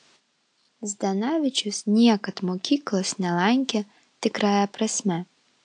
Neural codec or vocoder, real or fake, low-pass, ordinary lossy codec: none; real; 9.9 kHz; AAC, 64 kbps